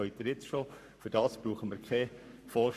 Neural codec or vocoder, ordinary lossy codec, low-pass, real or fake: codec, 44.1 kHz, 7.8 kbps, Pupu-Codec; none; 14.4 kHz; fake